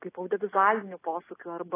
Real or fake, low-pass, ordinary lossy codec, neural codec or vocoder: real; 3.6 kHz; AAC, 16 kbps; none